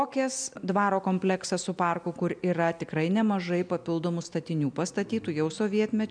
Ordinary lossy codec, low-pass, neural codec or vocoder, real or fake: MP3, 96 kbps; 9.9 kHz; none; real